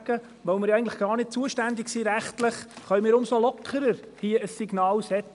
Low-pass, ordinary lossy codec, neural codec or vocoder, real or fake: 10.8 kHz; none; none; real